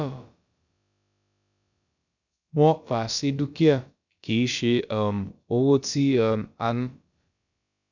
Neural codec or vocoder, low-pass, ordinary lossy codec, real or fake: codec, 16 kHz, about 1 kbps, DyCAST, with the encoder's durations; 7.2 kHz; none; fake